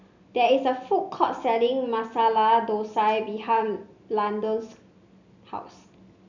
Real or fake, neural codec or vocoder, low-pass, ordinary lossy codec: real; none; 7.2 kHz; none